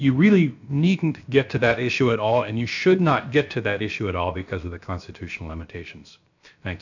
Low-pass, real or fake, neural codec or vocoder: 7.2 kHz; fake; codec, 16 kHz, about 1 kbps, DyCAST, with the encoder's durations